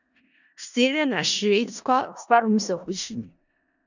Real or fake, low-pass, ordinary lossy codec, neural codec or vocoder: fake; 7.2 kHz; none; codec, 16 kHz in and 24 kHz out, 0.4 kbps, LongCat-Audio-Codec, four codebook decoder